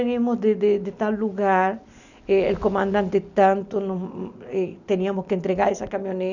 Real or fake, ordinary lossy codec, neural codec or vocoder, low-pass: real; none; none; 7.2 kHz